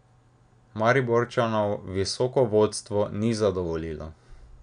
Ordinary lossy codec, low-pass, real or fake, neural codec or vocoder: none; 9.9 kHz; real; none